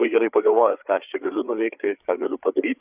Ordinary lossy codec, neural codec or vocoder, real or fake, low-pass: Opus, 64 kbps; codec, 16 kHz, 8 kbps, FreqCodec, larger model; fake; 3.6 kHz